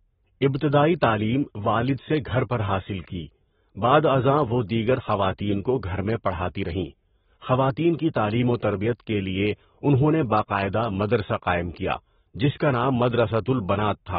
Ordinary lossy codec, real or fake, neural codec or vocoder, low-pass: AAC, 16 kbps; fake; codec, 44.1 kHz, 7.8 kbps, Pupu-Codec; 19.8 kHz